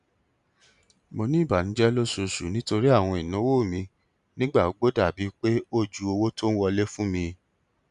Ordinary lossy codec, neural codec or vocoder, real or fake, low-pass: none; none; real; 10.8 kHz